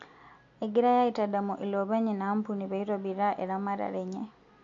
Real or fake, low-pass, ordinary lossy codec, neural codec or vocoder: real; 7.2 kHz; AAC, 48 kbps; none